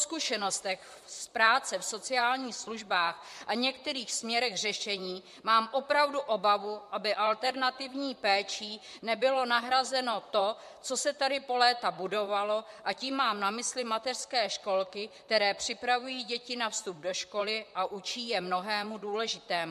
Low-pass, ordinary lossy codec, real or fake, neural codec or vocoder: 14.4 kHz; MP3, 64 kbps; fake; vocoder, 44.1 kHz, 128 mel bands, Pupu-Vocoder